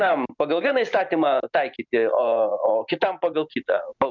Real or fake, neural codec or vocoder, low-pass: real; none; 7.2 kHz